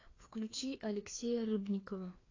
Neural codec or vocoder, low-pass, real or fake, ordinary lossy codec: codec, 16 kHz, 2 kbps, FreqCodec, larger model; 7.2 kHz; fake; AAC, 32 kbps